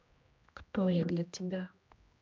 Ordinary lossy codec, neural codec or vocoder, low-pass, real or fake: MP3, 64 kbps; codec, 16 kHz, 1 kbps, X-Codec, HuBERT features, trained on general audio; 7.2 kHz; fake